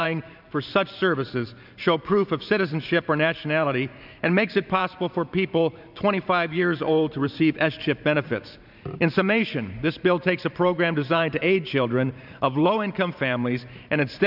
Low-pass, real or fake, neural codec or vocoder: 5.4 kHz; fake; vocoder, 44.1 kHz, 128 mel bands every 512 samples, BigVGAN v2